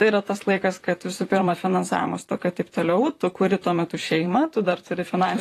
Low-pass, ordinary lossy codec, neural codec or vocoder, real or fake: 14.4 kHz; AAC, 48 kbps; vocoder, 44.1 kHz, 128 mel bands, Pupu-Vocoder; fake